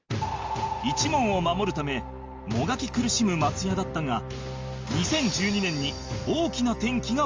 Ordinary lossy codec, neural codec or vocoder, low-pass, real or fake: Opus, 32 kbps; none; 7.2 kHz; real